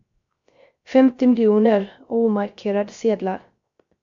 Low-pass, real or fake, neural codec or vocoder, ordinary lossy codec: 7.2 kHz; fake; codec, 16 kHz, 0.3 kbps, FocalCodec; MP3, 48 kbps